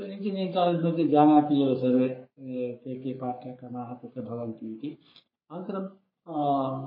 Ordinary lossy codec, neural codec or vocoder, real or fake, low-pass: MP3, 24 kbps; codec, 44.1 kHz, 3.4 kbps, Pupu-Codec; fake; 5.4 kHz